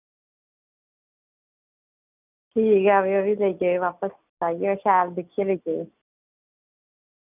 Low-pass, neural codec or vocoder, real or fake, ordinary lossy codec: 3.6 kHz; none; real; none